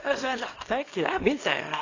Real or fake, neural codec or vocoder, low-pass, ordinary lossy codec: fake; codec, 24 kHz, 0.9 kbps, WavTokenizer, small release; 7.2 kHz; AAC, 32 kbps